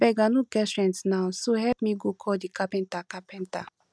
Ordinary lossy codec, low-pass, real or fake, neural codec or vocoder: none; none; real; none